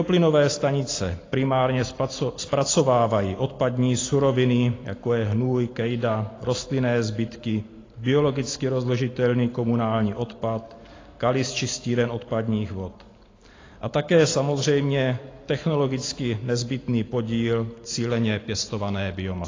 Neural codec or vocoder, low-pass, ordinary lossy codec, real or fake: none; 7.2 kHz; AAC, 32 kbps; real